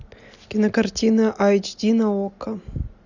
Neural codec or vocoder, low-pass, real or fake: none; 7.2 kHz; real